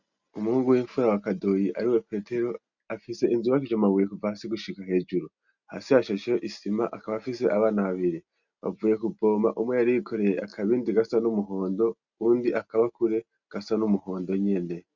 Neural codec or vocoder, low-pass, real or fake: none; 7.2 kHz; real